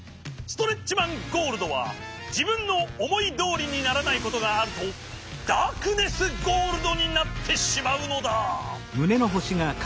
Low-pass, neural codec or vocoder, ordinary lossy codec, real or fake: none; none; none; real